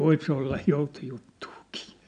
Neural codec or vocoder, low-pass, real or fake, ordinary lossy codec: none; 9.9 kHz; real; none